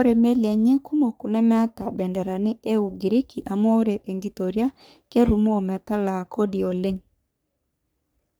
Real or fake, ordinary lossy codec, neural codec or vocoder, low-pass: fake; none; codec, 44.1 kHz, 3.4 kbps, Pupu-Codec; none